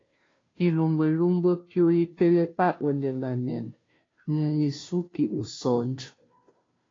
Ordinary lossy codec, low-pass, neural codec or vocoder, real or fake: AAC, 32 kbps; 7.2 kHz; codec, 16 kHz, 0.5 kbps, FunCodec, trained on Chinese and English, 25 frames a second; fake